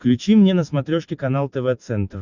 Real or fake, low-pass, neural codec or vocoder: real; 7.2 kHz; none